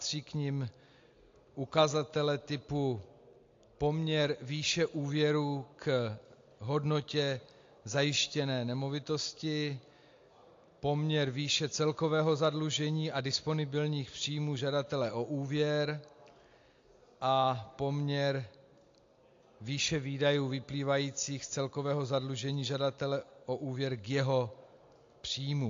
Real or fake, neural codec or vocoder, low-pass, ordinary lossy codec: real; none; 7.2 kHz; AAC, 48 kbps